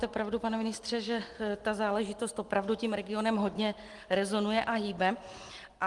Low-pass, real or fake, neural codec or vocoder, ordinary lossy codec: 10.8 kHz; real; none; Opus, 24 kbps